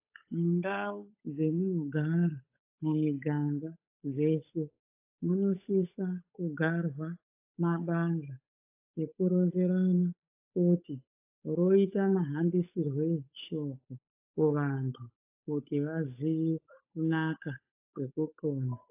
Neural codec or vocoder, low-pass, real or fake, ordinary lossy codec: codec, 16 kHz, 8 kbps, FunCodec, trained on Chinese and English, 25 frames a second; 3.6 kHz; fake; AAC, 32 kbps